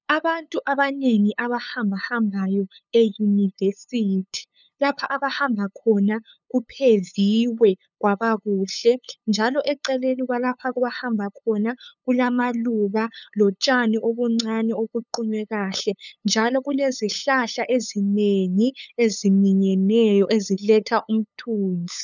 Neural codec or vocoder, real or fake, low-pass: codec, 16 kHz, 8 kbps, FunCodec, trained on LibriTTS, 25 frames a second; fake; 7.2 kHz